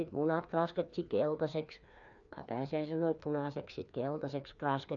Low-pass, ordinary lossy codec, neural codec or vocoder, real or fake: 7.2 kHz; none; codec, 16 kHz, 2 kbps, FreqCodec, larger model; fake